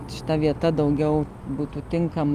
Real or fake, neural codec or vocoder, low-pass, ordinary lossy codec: real; none; 14.4 kHz; Opus, 24 kbps